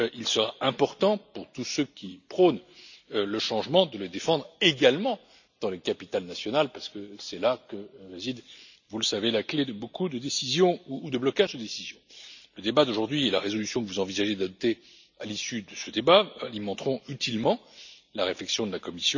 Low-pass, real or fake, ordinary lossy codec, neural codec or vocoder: 7.2 kHz; real; none; none